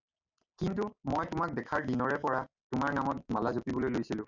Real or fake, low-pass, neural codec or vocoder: real; 7.2 kHz; none